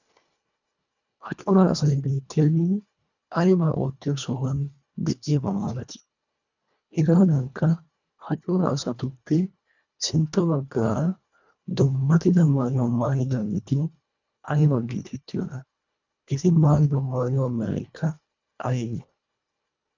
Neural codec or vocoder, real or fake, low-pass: codec, 24 kHz, 1.5 kbps, HILCodec; fake; 7.2 kHz